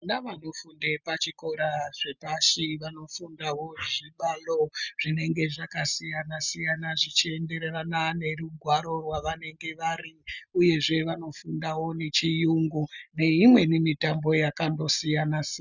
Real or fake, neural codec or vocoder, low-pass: real; none; 7.2 kHz